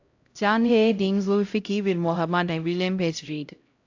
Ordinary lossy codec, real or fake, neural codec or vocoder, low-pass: AAC, 48 kbps; fake; codec, 16 kHz, 0.5 kbps, X-Codec, HuBERT features, trained on LibriSpeech; 7.2 kHz